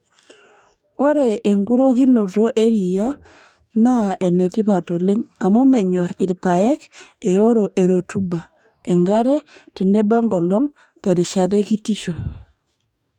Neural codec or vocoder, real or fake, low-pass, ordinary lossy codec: codec, 44.1 kHz, 2.6 kbps, DAC; fake; 19.8 kHz; none